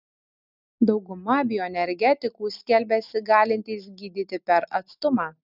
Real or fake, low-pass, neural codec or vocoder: real; 5.4 kHz; none